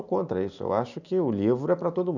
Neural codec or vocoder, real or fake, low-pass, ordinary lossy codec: none; real; 7.2 kHz; none